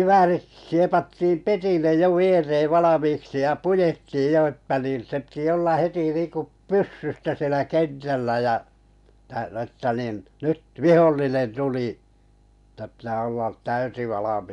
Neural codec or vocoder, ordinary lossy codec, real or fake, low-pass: none; none; real; 10.8 kHz